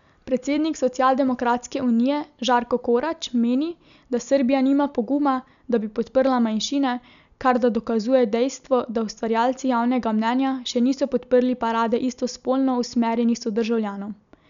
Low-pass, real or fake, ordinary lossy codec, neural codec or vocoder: 7.2 kHz; real; none; none